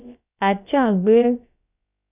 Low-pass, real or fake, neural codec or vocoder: 3.6 kHz; fake; codec, 16 kHz, about 1 kbps, DyCAST, with the encoder's durations